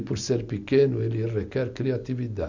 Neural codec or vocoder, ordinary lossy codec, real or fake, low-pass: none; none; real; 7.2 kHz